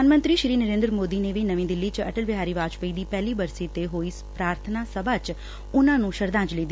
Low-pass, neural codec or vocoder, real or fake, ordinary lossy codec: none; none; real; none